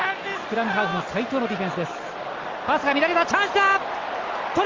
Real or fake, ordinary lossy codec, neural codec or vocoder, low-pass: real; Opus, 32 kbps; none; 7.2 kHz